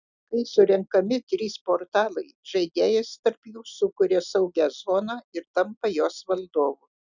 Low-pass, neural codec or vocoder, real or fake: 7.2 kHz; none; real